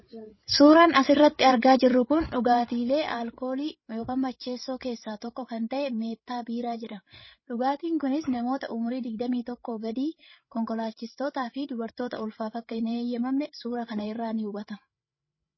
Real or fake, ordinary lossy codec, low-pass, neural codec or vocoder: fake; MP3, 24 kbps; 7.2 kHz; codec, 16 kHz, 16 kbps, FreqCodec, larger model